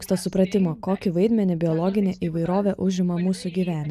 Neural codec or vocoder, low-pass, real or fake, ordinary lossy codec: none; 14.4 kHz; real; AAC, 96 kbps